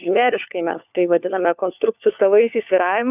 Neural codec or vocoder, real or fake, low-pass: codec, 16 kHz, 4 kbps, FunCodec, trained on LibriTTS, 50 frames a second; fake; 3.6 kHz